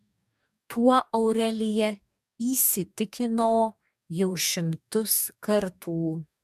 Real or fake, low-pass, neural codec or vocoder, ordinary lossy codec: fake; 14.4 kHz; codec, 44.1 kHz, 2.6 kbps, DAC; MP3, 96 kbps